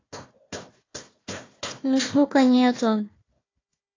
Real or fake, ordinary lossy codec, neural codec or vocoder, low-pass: fake; AAC, 32 kbps; codec, 16 kHz, 1 kbps, FunCodec, trained on Chinese and English, 50 frames a second; 7.2 kHz